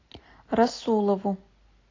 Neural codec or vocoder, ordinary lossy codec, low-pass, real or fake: none; AAC, 32 kbps; 7.2 kHz; real